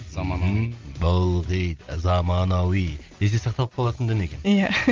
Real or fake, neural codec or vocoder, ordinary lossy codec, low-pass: real; none; Opus, 32 kbps; 7.2 kHz